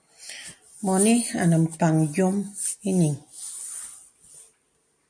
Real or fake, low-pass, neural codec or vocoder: real; 9.9 kHz; none